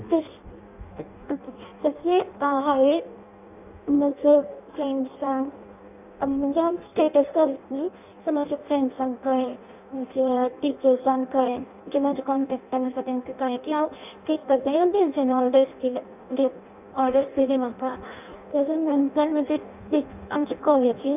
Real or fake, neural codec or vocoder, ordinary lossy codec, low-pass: fake; codec, 16 kHz in and 24 kHz out, 0.6 kbps, FireRedTTS-2 codec; none; 3.6 kHz